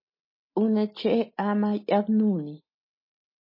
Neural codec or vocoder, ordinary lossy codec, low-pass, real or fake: none; MP3, 24 kbps; 5.4 kHz; real